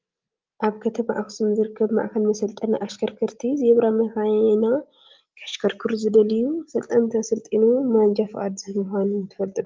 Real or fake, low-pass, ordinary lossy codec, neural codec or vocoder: real; 7.2 kHz; Opus, 32 kbps; none